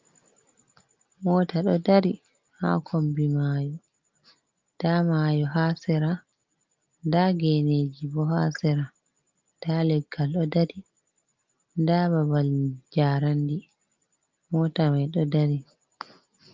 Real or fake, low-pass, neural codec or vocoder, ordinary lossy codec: real; 7.2 kHz; none; Opus, 32 kbps